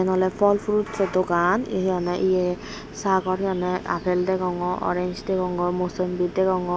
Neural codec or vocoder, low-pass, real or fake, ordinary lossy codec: none; none; real; none